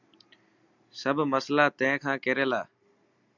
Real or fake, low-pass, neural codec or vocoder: real; 7.2 kHz; none